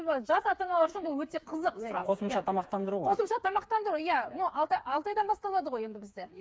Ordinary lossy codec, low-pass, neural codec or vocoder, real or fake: none; none; codec, 16 kHz, 4 kbps, FreqCodec, smaller model; fake